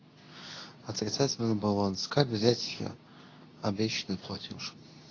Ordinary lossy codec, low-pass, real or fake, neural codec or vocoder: AAC, 32 kbps; 7.2 kHz; fake; codec, 24 kHz, 0.9 kbps, WavTokenizer, medium speech release version 2